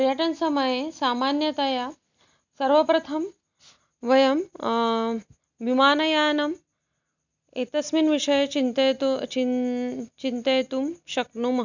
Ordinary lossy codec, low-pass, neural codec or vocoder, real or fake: none; 7.2 kHz; none; real